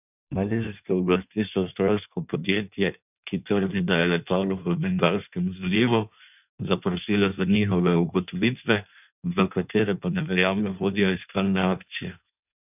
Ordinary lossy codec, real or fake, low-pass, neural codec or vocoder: none; fake; 3.6 kHz; codec, 16 kHz in and 24 kHz out, 1.1 kbps, FireRedTTS-2 codec